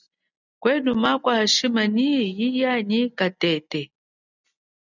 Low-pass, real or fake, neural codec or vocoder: 7.2 kHz; real; none